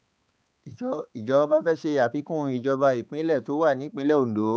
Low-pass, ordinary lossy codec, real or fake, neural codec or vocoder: none; none; fake; codec, 16 kHz, 4 kbps, X-Codec, HuBERT features, trained on balanced general audio